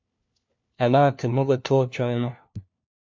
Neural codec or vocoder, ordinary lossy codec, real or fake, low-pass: codec, 16 kHz, 1 kbps, FunCodec, trained on LibriTTS, 50 frames a second; MP3, 64 kbps; fake; 7.2 kHz